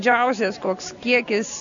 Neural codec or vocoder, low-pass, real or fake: none; 7.2 kHz; real